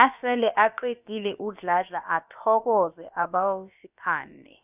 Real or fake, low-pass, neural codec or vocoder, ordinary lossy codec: fake; 3.6 kHz; codec, 16 kHz, about 1 kbps, DyCAST, with the encoder's durations; none